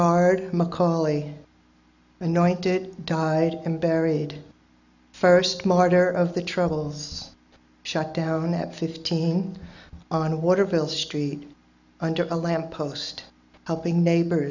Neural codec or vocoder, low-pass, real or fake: none; 7.2 kHz; real